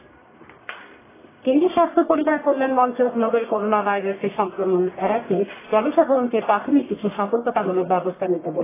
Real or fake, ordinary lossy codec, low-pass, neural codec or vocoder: fake; AAC, 16 kbps; 3.6 kHz; codec, 44.1 kHz, 1.7 kbps, Pupu-Codec